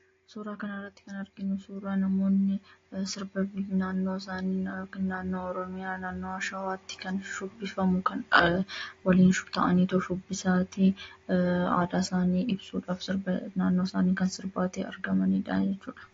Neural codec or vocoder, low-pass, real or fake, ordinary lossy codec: none; 7.2 kHz; real; AAC, 32 kbps